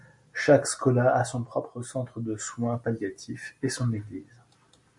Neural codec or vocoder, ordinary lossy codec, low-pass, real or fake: none; MP3, 48 kbps; 10.8 kHz; real